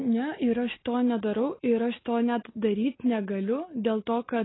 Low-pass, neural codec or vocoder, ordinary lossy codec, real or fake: 7.2 kHz; none; AAC, 16 kbps; real